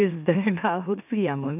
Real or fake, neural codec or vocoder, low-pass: fake; autoencoder, 44.1 kHz, a latent of 192 numbers a frame, MeloTTS; 3.6 kHz